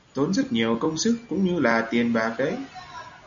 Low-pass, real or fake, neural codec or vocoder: 7.2 kHz; real; none